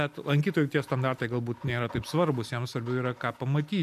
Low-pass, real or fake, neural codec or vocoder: 14.4 kHz; real; none